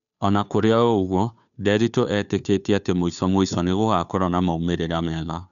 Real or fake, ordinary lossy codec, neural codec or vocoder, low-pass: fake; none; codec, 16 kHz, 2 kbps, FunCodec, trained on Chinese and English, 25 frames a second; 7.2 kHz